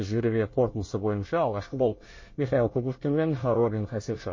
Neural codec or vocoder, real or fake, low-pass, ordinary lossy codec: codec, 24 kHz, 1 kbps, SNAC; fake; 7.2 kHz; MP3, 32 kbps